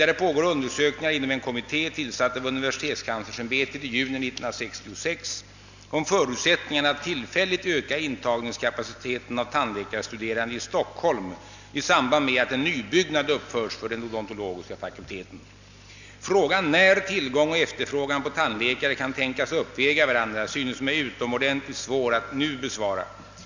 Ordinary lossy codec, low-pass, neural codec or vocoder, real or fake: none; 7.2 kHz; none; real